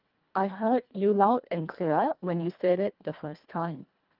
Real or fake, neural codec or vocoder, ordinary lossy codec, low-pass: fake; codec, 24 kHz, 1.5 kbps, HILCodec; Opus, 32 kbps; 5.4 kHz